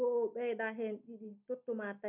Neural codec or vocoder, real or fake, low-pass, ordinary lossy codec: none; real; 3.6 kHz; none